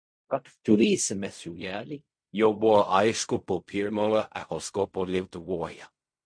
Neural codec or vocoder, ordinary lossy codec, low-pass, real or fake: codec, 16 kHz in and 24 kHz out, 0.4 kbps, LongCat-Audio-Codec, fine tuned four codebook decoder; MP3, 48 kbps; 9.9 kHz; fake